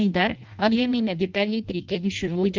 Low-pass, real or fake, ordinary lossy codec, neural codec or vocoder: 7.2 kHz; fake; Opus, 32 kbps; codec, 16 kHz in and 24 kHz out, 0.6 kbps, FireRedTTS-2 codec